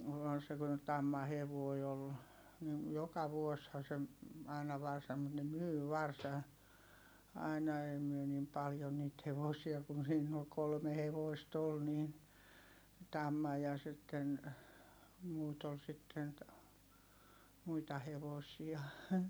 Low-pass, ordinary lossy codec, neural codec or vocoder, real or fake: none; none; none; real